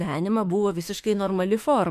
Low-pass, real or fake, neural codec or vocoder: 14.4 kHz; fake; autoencoder, 48 kHz, 32 numbers a frame, DAC-VAE, trained on Japanese speech